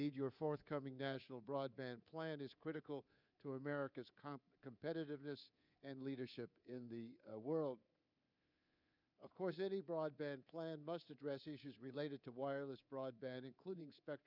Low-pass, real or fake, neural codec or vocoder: 5.4 kHz; fake; codec, 24 kHz, 3.1 kbps, DualCodec